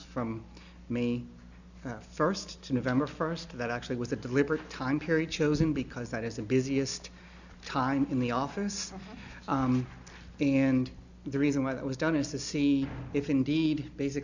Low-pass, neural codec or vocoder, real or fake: 7.2 kHz; none; real